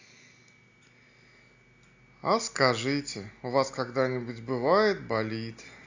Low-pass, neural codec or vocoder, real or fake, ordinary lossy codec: 7.2 kHz; none; real; AAC, 48 kbps